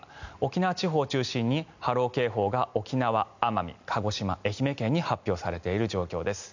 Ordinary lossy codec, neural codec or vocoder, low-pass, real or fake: none; none; 7.2 kHz; real